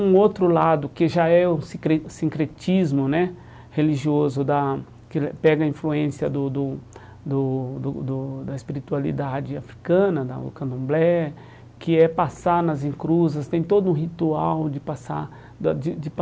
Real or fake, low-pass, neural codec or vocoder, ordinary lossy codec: real; none; none; none